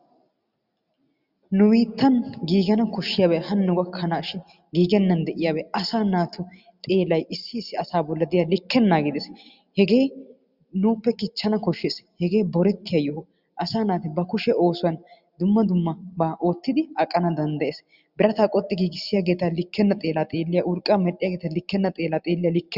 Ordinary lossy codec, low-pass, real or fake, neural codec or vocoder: Opus, 64 kbps; 5.4 kHz; real; none